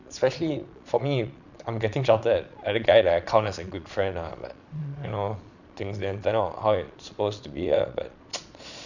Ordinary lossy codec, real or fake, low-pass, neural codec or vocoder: none; fake; 7.2 kHz; vocoder, 22.05 kHz, 80 mel bands, Vocos